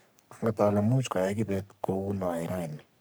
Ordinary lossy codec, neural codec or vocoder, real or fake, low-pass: none; codec, 44.1 kHz, 3.4 kbps, Pupu-Codec; fake; none